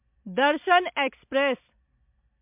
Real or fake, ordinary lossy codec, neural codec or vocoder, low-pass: real; MP3, 32 kbps; none; 3.6 kHz